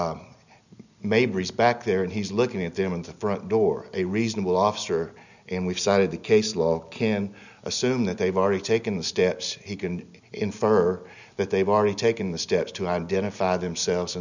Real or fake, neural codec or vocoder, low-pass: real; none; 7.2 kHz